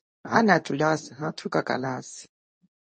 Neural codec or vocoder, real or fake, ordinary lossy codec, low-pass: codec, 24 kHz, 0.9 kbps, WavTokenizer, medium speech release version 2; fake; MP3, 32 kbps; 10.8 kHz